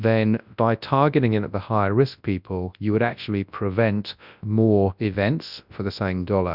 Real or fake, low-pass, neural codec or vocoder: fake; 5.4 kHz; codec, 24 kHz, 0.9 kbps, WavTokenizer, large speech release